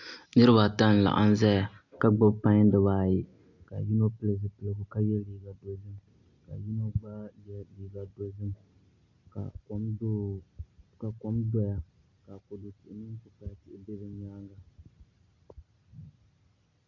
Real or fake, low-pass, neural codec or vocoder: real; 7.2 kHz; none